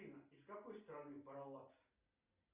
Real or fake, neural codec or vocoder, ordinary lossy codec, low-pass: real; none; Opus, 64 kbps; 3.6 kHz